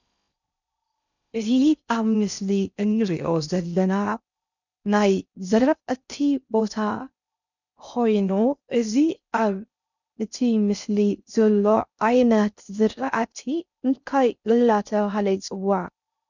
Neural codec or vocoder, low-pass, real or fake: codec, 16 kHz in and 24 kHz out, 0.6 kbps, FocalCodec, streaming, 4096 codes; 7.2 kHz; fake